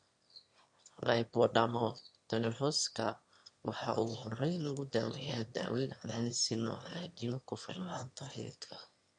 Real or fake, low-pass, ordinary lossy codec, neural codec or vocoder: fake; 9.9 kHz; MP3, 48 kbps; autoencoder, 22.05 kHz, a latent of 192 numbers a frame, VITS, trained on one speaker